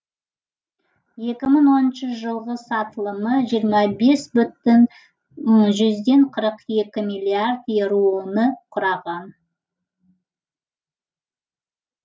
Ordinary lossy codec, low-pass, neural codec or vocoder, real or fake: none; none; none; real